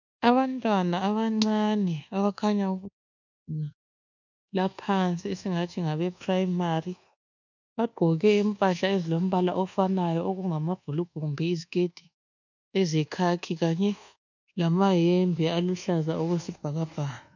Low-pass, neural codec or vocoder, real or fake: 7.2 kHz; codec, 24 kHz, 1.2 kbps, DualCodec; fake